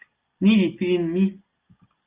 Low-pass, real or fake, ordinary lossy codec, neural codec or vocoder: 3.6 kHz; real; Opus, 32 kbps; none